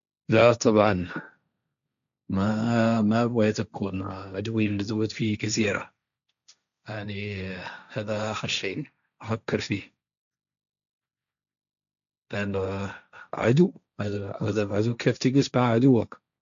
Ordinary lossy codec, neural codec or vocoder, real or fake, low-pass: AAC, 96 kbps; codec, 16 kHz, 1.1 kbps, Voila-Tokenizer; fake; 7.2 kHz